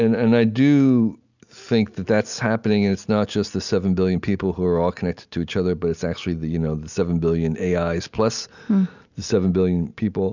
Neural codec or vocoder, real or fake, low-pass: none; real; 7.2 kHz